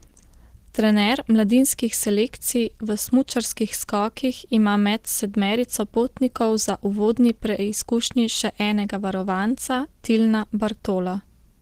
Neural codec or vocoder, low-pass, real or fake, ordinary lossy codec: none; 14.4 kHz; real; Opus, 16 kbps